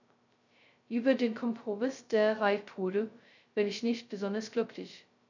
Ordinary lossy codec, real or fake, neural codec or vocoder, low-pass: none; fake; codec, 16 kHz, 0.2 kbps, FocalCodec; 7.2 kHz